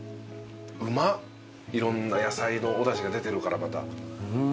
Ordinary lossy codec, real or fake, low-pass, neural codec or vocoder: none; real; none; none